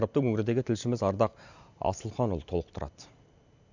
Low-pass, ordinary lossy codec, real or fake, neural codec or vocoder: 7.2 kHz; none; real; none